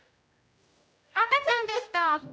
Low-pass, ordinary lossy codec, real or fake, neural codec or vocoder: none; none; fake; codec, 16 kHz, 0.5 kbps, X-Codec, HuBERT features, trained on general audio